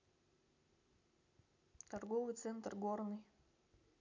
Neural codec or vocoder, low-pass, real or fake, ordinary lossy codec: none; 7.2 kHz; real; none